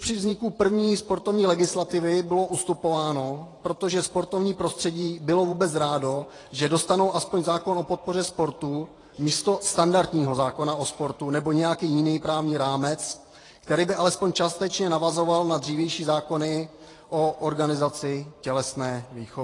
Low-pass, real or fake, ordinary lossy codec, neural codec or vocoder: 10.8 kHz; fake; AAC, 32 kbps; vocoder, 44.1 kHz, 128 mel bands every 512 samples, BigVGAN v2